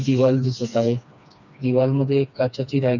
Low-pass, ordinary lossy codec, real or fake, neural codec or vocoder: 7.2 kHz; none; fake; codec, 16 kHz, 2 kbps, FreqCodec, smaller model